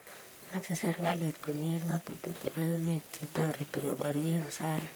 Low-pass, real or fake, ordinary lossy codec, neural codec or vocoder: none; fake; none; codec, 44.1 kHz, 1.7 kbps, Pupu-Codec